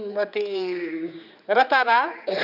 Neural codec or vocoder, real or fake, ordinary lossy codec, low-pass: codec, 16 kHz, 4 kbps, X-Codec, HuBERT features, trained on general audio; fake; none; 5.4 kHz